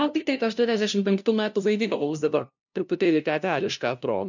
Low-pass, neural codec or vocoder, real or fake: 7.2 kHz; codec, 16 kHz, 0.5 kbps, FunCodec, trained on LibriTTS, 25 frames a second; fake